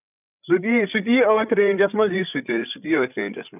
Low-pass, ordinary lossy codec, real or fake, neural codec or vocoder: 3.6 kHz; none; fake; codec, 16 kHz, 8 kbps, FreqCodec, larger model